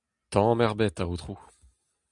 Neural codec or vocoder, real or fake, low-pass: none; real; 10.8 kHz